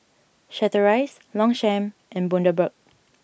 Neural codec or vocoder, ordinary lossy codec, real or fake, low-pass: none; none; real; none